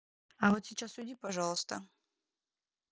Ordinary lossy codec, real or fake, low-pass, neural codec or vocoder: none; real; none; none